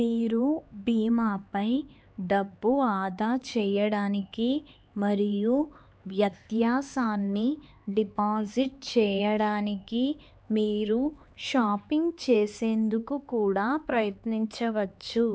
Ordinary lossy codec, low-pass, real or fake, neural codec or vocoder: none; none; fake; codec, 16 kHz, 4 kbps, X-Codec, HuBERT features, trained on LibriSpeech